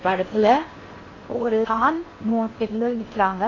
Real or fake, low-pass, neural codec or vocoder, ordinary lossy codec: fake; 7.2 kHz; codec, 16 kHz in and 24 kHz out, 0.6 kbps, FocalCodec, streaming, 4096 codes; AAC, 32 kbps